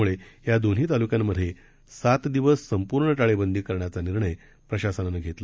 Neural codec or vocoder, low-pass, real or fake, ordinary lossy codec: none; none; real; none